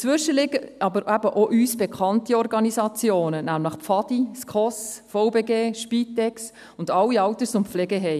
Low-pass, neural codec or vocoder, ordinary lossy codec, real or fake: 14.4 kHz; none; none; real